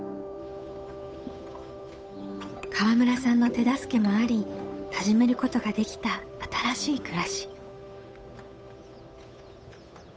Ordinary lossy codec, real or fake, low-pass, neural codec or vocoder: none; fake; none; codec, 16 kHz, 8 kbps, FunCodec, trained on Chinese and English, 25 frames a second